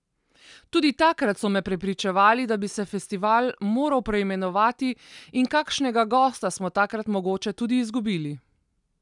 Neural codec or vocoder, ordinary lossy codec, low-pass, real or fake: none; none; 10.8 kHz; real